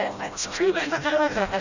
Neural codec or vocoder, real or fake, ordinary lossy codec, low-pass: codec, 16 kHz, 0.5 kbps, FreqCodec, smaller model; fake; none; 7.2 kHz